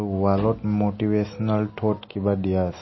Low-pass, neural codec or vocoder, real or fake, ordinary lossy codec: 7.2 kHz; none; real; MP3, 24 kbps